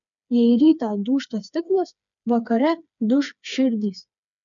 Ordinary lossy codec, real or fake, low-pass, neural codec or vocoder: MP3, 96 kbps; fake; 7.2 kHz; codec, 16 kHz, 4 kbps, FreqCodec, smaller model